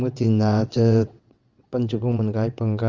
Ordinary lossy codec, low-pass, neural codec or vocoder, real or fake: Opus, 32 kbps; 7.2 kHz; vocoder, 22.05 kHz, 80 mel bands, WaveNeXt; fake